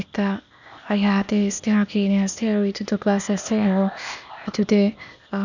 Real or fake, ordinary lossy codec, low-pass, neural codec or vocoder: fake; none; 7.2 kHz; codec, 16 kHz, 0.8 kbps, ZipCodec